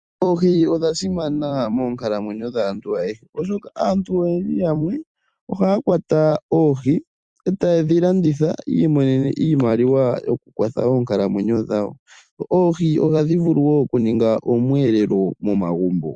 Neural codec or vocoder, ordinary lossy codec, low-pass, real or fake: vocoder, 44.1 kHz, 128 mel bands every 256 samples, BigVGAN v2; Opus, 64 kbps; 9.9 kHz; fake